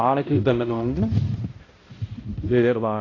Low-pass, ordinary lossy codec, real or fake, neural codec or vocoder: 7.2 kHz; AAC, 32 kbps; fake; codec, 16 kHz, 0.5 kbps, X-Codec, HuBERT features, trained on balanced general audio